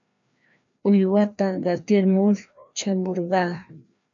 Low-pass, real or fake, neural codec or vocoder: 7.2 kHz; fake; codec, 16 kHz, 1 kbps, FreqCodec, larger model